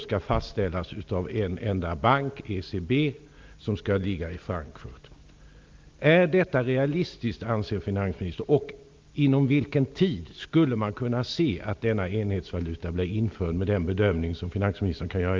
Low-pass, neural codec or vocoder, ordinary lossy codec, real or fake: 7.2 kHz; none; Opus, 32 kbps; real